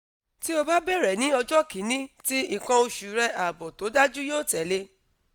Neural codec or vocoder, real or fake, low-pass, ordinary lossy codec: none; real; none; none